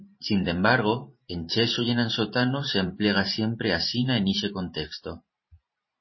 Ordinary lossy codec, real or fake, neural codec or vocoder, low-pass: MP3, 24 kbps; fake; vocoder, 44.1 kHz, 128 mel bands every 512 samples, BigVGAN v2; 7.2 kHz